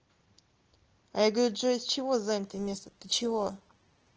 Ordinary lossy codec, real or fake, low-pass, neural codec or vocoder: Opus, 16 kbps; real; 7.2 kHz; none